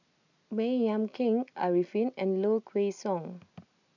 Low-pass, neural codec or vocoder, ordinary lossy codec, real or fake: 7.2 kHz; none; none; real